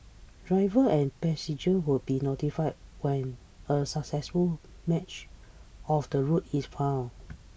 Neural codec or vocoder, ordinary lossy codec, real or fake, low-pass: none; none; real; none